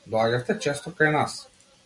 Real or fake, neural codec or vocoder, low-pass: real; none; 10.8 kHz